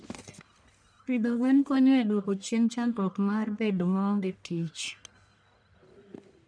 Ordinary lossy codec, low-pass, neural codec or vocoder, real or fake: none; 9.9 kHz; codec, 44.1 kHz, 1.7 kbps, Pupu-Codec; fake